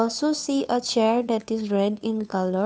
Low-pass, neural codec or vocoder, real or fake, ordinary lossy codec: none; codec, 16 kHz, 2 kbps, FunCodec, trained on Chinese and English, 25 frames a second; fake; none